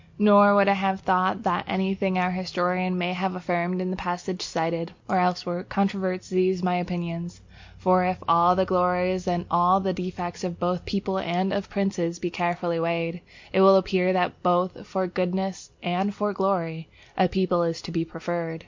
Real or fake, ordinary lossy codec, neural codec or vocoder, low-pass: real; MP3, 48 kbps; none; 7.2 kHz